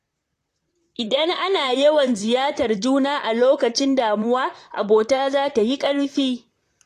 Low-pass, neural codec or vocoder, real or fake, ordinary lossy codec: 14.4 kHz; vocoder, 44.1 kHz, 128 mel bands, Pupu-Vocoder; fake; AAC, 48 kbps